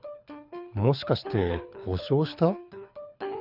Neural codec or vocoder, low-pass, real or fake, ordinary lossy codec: codec, 24 kHz, 6 kbps, HILCodec; 5.4 kHz; fake; none